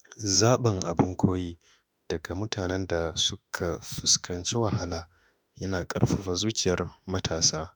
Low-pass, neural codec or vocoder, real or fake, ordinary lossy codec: none; autoencoder, 48 kHz, 32 numbers a frame, DAC-VAE, trained on Japanese speech; fake; none